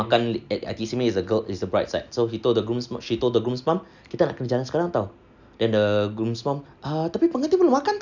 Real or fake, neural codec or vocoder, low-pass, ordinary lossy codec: real; none; 7.2 kHz; none